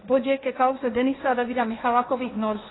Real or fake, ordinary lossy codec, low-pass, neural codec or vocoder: fake; AAC, 16 kbps; 7.2 kHz; codec, 16 kHz, 1.1 kbps, Voila-Tokenizer